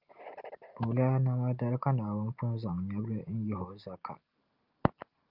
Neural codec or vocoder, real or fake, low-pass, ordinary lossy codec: none; real; 5.4 kHz; Opus, 32 kbps